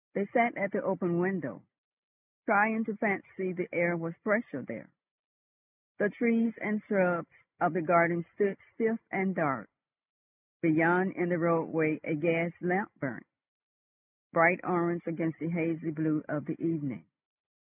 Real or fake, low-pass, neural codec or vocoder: real; 3.6 kHz; none